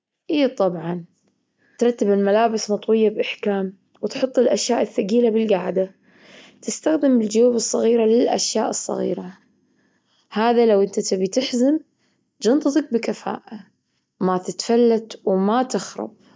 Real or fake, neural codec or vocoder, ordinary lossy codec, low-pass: real; none; none; none